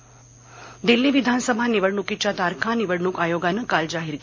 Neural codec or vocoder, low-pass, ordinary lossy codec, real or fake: none; 7.2 kHz; MP3, 48 kbps; real